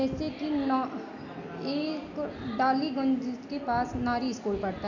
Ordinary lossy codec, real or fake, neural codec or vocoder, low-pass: none; real; none; 7.2 kHz